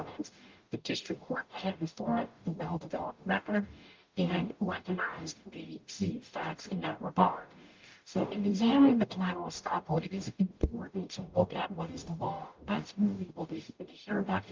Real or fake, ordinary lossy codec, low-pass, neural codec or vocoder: fake; Opus, 24 kbps; 7.2 kHz; codec, 44.1 kHz, 0.9 kbps, DAC